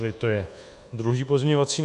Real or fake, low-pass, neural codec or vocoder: fake; 10.8 kHz; codec, 24 kHz, 1.2 kbps, DualCodec